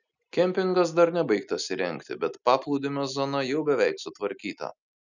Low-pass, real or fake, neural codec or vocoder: 7.2 kHz; real; none